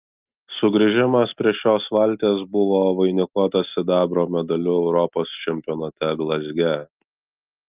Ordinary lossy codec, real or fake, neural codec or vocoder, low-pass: Opus, 32 kbps; real; none; 3.6 kHz